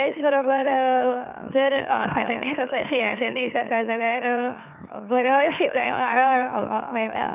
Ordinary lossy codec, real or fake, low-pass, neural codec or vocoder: none; fake; 3.6 kHz; autoencoder, 44.1 kHz, a latent of 192 numbers a frame, MeloTTS